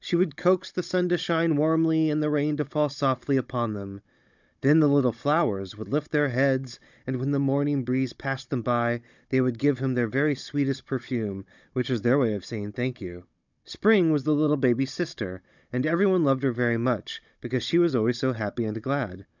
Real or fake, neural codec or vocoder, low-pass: fake; codec, 16 kHz, 16 kbps, FunCodec, trained on Chinese and English, 50 frames a second; 7.2 kHz